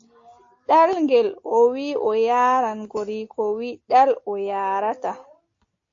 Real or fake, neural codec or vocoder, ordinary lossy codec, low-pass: real; none; AAC, 48 kbps; 7.2 kHz